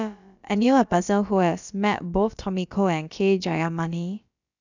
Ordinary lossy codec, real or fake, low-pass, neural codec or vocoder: none; fake; 7.2 kHz; codec, 16 kHz, about 1 kbps, DyCAST, with the encoder's durations